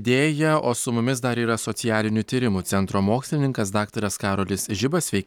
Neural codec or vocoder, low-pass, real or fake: none; 19.8 kHz; real